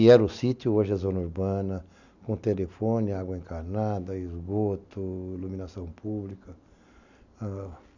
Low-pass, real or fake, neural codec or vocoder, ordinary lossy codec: 7.2 kHz; real; none; none